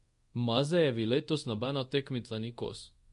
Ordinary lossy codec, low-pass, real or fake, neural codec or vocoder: MP3, 48 kbps; 10.8 kHz; fake; codec, 24 kHz, 0.5 kbps, DualCodec